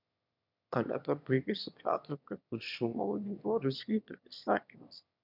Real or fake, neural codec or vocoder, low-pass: fake; autoencoder, 22.05 kHz, a latent of 192 numbers a frame, VITS, trained on one speaker; 5.4 kHz